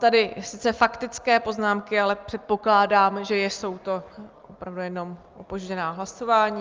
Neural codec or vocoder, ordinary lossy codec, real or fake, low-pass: none; Opus, 32 kbps; real; 7.2 kHz